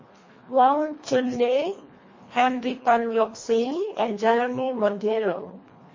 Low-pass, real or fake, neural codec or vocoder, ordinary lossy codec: 7.2 kHz; fake; codec, 24 kHz, 1.5 kbps, HILCodec; MP3, 32 kbps